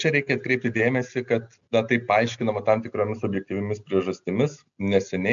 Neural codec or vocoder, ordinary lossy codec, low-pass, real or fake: none; MP3, 64 kbps; 7.2 kHz; real